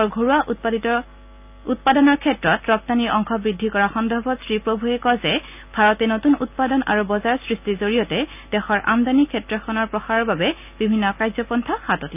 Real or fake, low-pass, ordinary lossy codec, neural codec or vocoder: real; 3.6 kHz; none; none